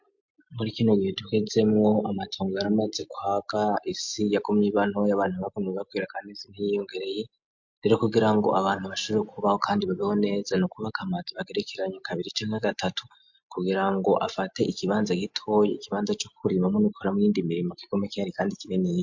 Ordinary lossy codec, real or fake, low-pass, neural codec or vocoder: MP3, 48 kbps; real; 7.2 kHz; none